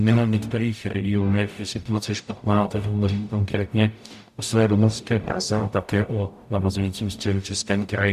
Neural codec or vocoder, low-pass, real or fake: codec, 44.1 kHz, 0.9 kbps, DAC; 14.4 kHz; fake